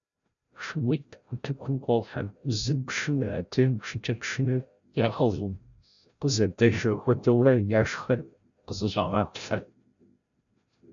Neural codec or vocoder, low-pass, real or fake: codec, 16 kHz, 0.5 kbps, FreqCodec, larger model; 7.2 kHz; fake